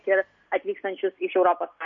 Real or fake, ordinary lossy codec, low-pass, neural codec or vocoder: real; MP3, 48 kbps; 7.2 kHz; none